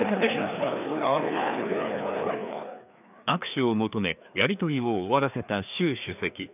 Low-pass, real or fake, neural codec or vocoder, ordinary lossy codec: 3.6 kHz; fake; codec, 16 kHz, 2 kbps, FreqCodec, larger model; none